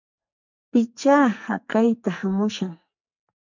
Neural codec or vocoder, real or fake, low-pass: codec, 44.1 kHz, 2.6 kbps, SNAC; fake; 7.2 kHz